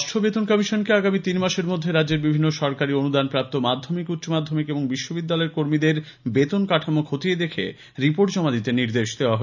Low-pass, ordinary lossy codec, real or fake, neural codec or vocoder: 7.2 kHz; none; real; none